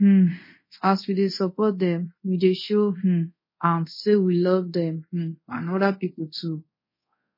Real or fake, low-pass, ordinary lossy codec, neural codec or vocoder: fake; 5.4 kHz; MP3, 24 kbps; codec, 24 kHz, 0.5 kbps, DualCodec